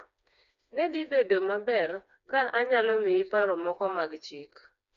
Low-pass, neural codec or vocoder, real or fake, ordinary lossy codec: 7.2 kHz; codec, 16 kHz, 2 kbps, FreqCodec, smaller model; fake; none